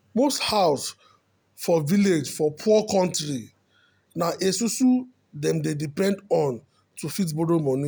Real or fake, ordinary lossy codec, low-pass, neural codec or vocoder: real; none; none; none